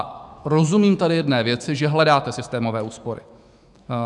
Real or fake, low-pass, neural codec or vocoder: fake; 10.8 kHz; autoencoder, 48 kHz, 128 numbers a frame, DAC-VAE, trained on Japanese speech